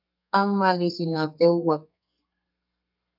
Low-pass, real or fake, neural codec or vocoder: 5.4 kHz; fake; codec, 32 kHz, 1.9 kbps, SNAC